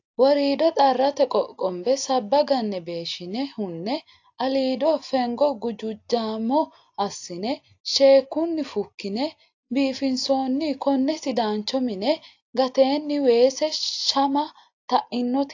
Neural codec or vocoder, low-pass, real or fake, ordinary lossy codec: none; 7.2 kHz; real; AAC, 48 kbps